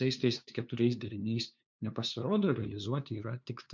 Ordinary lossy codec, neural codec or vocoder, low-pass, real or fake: MP3, 64 kbps; codec, 16 kHz, 2 kbps, FunCodec, trained on LibriTTS, 25 frames a second; 7.2 kHz; fake